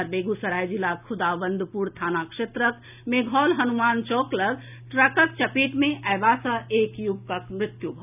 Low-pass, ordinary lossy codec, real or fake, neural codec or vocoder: 3.6 kHz; none; real; none